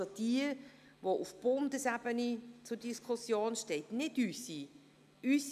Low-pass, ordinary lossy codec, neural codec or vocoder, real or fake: 14.4 kHz; none; none; real